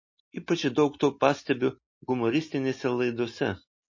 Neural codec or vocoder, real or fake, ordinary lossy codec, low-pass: none; real; MP3, 32 kbps; 7.2 kHz